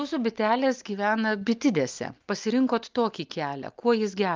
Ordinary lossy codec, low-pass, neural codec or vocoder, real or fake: Opus, 32 kbps; 7.2 kHz; none; real